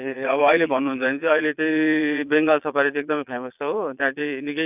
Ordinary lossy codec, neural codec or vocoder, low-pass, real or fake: none; vocoder, 22.05 kHz, 80 mel bands, Vocos; 3.6 kHz; fake